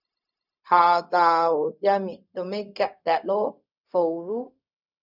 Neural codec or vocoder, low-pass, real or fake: codec, 16 kHz, 0.4 kbps, LongCat-Audio-Codec; 5.4 kHz; fake